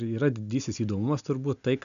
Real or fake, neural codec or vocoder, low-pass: real; none; 7.2 kHz